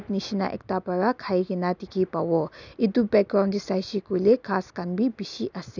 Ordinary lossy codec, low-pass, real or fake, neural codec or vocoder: none; 7.2 kHz; real; none